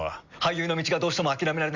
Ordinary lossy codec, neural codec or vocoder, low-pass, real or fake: Opus, 64 kbps; none; 7.2 kHz; real